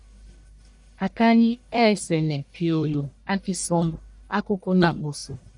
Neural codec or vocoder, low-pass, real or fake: codec, 44.1 kHz, 1.7 kbps, Pupu-Codec; 10.8 kHz; fake